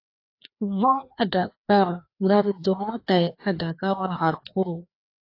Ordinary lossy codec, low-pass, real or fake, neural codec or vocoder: AAC, 32 kbps; 5.4 kHz; fake; codec, 16 kHz, 2 kbps, FreqCodec, larger model